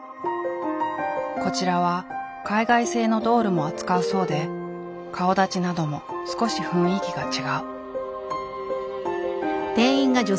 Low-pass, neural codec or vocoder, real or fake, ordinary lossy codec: none; none; real; none